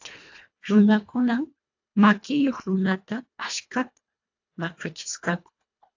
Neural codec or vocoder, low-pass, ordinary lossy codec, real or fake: codec, 24 kHz, 1.5 kbps, HILCodec; 7.2 kHz; AAC, 48 kbps; fake